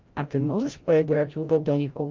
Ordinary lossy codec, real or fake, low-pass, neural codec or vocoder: Opus, 32 kbps; fake; 7.2 kHz; codec, 16 kHz, 0.5 kbps, FreqCodec, larger model